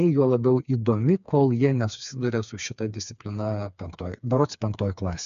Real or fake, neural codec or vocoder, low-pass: fake; codec, 16 kHz, 4 kbps, FreqCodec, smaller model; 7.2 kHz